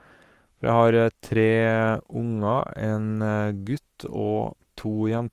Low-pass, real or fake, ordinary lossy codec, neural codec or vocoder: 14.4 kHz; fake; Opus, 32 kbps; codec, 44.1 kHz, 7.8 kbps, Pupu-Codec